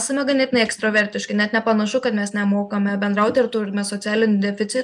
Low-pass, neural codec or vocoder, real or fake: 10.8 kHz; none; real